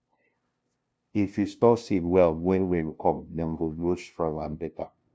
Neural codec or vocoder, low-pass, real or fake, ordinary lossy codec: codec, 16 kHz, 0.5 kbps, FunCodec, trained on LibriTTS, 25 frames a second; none; fake; none